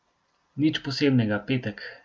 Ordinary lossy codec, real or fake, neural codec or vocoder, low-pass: none; real; none; none